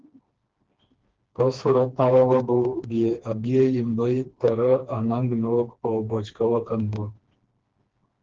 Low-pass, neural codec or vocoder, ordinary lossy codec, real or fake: 7.2 kHz; codec, 16 kHz, 2 kbps, FreqCodec, smaller model; Opus, 16 kbps; fake